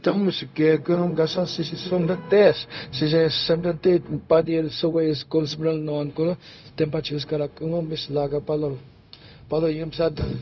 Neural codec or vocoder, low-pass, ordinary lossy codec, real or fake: codec, 16 kHz, 0.4 kbps, LongCat-Audio-Codec; 7.2 kHz; none; fake